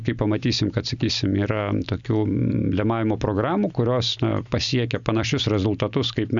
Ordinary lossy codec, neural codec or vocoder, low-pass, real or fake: Opus, 64 kbps; none; 7.2 kHz; real